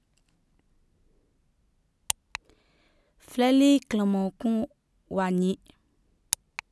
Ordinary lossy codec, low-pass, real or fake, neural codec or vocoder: none; none; real; none